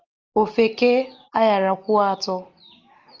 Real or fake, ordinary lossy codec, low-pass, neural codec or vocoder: real; Opus, 24 kbps; 7.2 kHz; none